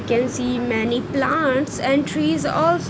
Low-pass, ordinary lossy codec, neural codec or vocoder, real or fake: none; none; none; real